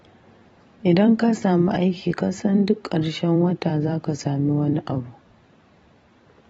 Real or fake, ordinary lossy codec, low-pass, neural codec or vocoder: fake; AAC, 24 kbps; 19.8 kHz; vocoder, 44.1 kHz, 128 mel bands every 256 samples, BigVGAN v2